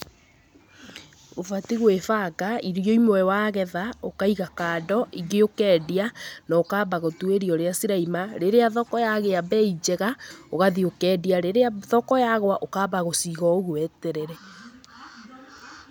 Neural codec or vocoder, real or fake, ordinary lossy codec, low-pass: none; real; none; none